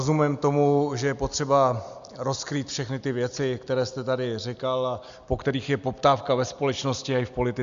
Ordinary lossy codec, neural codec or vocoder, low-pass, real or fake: Opus, 64 kbps; none; 7.2 kHz; real